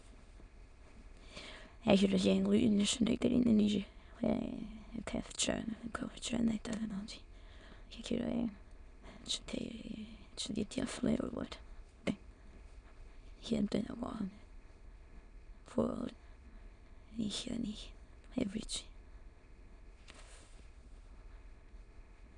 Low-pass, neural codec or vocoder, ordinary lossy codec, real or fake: 9.9 kHz; autoencoder, 22.05 kHz, a latent of 192 numbers a frame, VITS, trained on many speakers; none; fake